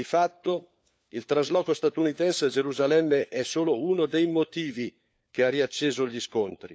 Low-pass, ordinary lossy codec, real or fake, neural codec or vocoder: none; none; fake; codec, 16 kHz, 4 kbps, FunCodec, trained on LibriTTS, 50 frames a second